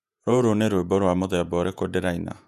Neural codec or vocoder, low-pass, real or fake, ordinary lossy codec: vocoder, 48 kHz, 128 mel bands, Vocos; 14.4 kHz; fake; none